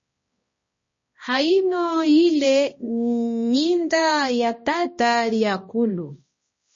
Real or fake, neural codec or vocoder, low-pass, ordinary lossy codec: fake; codec, 16 kHz, 1 kbps, X-Codec, HuBERT features, trained on balanced general audio; 7.2 kHz; MP3, 32 kbps